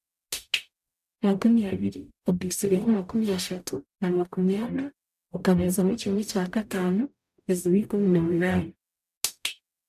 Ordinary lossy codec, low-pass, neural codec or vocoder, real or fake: none; 14.4 kHz; codec, 44.1 kHz, 0.9 kbps, DAC; fake